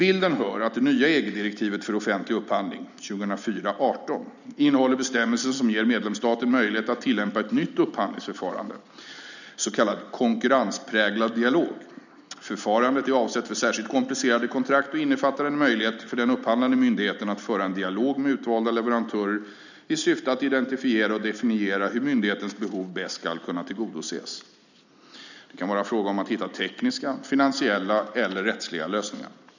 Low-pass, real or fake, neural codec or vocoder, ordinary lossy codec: 7.2 kHz; real; none; none